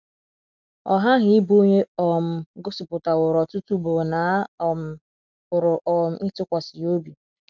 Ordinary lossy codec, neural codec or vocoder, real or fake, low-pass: none; none; real; 7.2 kHz